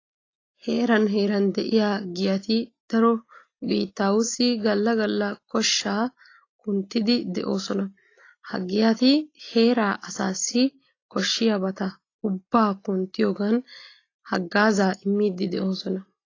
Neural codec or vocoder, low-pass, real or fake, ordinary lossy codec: none; 7.2 kHz; real; AAC, 32 kbps